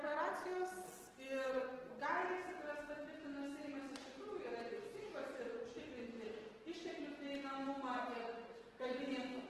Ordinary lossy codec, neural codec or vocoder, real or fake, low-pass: Opus, 16 kbps; none; real; 14.4 kHz